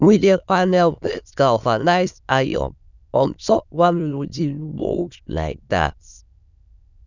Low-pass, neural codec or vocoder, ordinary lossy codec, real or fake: 7.2 kHz; autoencoder, 22.05 kHz, a latent of 192 numbers a frame, VITS, trained on many speakers; none; fake